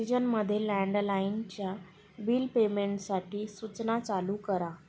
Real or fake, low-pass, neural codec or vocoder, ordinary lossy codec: real; none; none; none